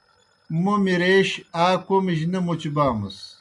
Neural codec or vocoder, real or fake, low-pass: none; real; 10.8 kHz